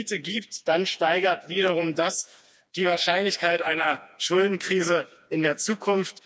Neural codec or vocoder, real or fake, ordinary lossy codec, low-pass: codec, 16 kHz, 2 kbps, FreqCodec, smaller model; fake; none; none